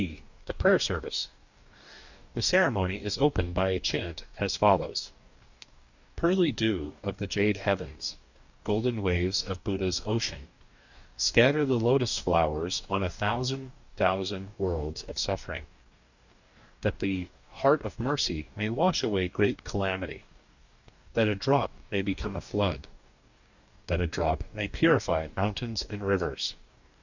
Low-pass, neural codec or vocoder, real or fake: 7.2 kHz; codec, 44.1 kHz, 2.6 kbps, DAC; fake